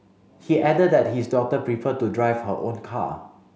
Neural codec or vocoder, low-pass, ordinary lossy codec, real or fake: none; none; none; real